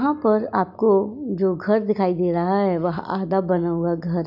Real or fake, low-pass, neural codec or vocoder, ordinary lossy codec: real; 5.4 kHz; none; none